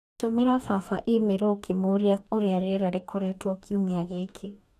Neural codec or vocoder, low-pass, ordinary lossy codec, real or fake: codec, 44.1 kHz, 2.6 kbps, DAC; 14.4 kHz; none; fake